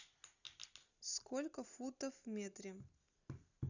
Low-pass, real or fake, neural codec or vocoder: 7.2 kHz; real; none